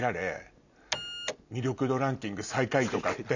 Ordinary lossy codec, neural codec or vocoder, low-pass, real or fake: none; none; 7.2 kHz; real